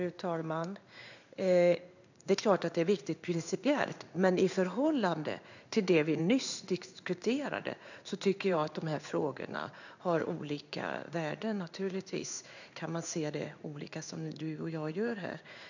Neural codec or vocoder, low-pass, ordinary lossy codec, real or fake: codec, 16 kHz in and 24 kHz out, 1 kbps, XY-Tokenizer; 7.2 kHz; none; fake